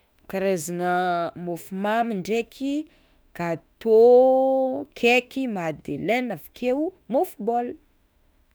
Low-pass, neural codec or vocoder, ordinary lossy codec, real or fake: none; autoencoder, 48 kHz, 32 numbers a frame, DAC-VAE, trained on Japanese speech; none; fake